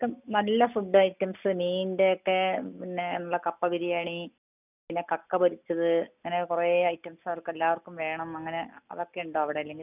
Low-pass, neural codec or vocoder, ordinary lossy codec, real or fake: 3.6 kHz; none; none; real